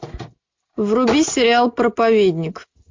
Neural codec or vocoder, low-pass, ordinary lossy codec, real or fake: none; 7.2 kHz; MP3, 48 kbps; real